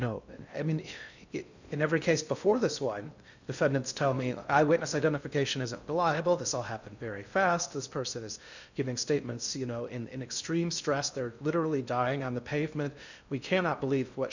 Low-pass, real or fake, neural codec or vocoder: 7.2 kHz; fake; codec, 16 kHz in and 24 kHz out, 0.6 kbps, FocalCodec, streaming, 2048 codes